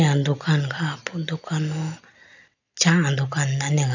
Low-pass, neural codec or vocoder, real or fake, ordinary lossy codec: 7.2 kHz; none; real; none